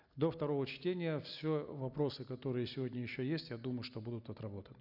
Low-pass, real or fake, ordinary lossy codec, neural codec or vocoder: 5.4 kHz; real; none; none